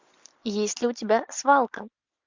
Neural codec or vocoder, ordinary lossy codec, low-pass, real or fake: none; MP3, 64 kbps; 7.2 kHz; real